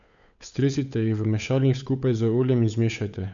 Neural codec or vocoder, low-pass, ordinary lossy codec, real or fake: codec, 16 kHz, 8 kbps, FunCodec, trained on Chinese and English, 25 frames a second; 7.2 kHz; none; fake